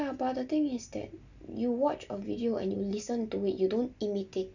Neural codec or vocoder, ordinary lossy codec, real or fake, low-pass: none; none; real; 7.2 kHz